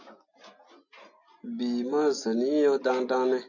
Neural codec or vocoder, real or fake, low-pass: none; real; 7.2 kHz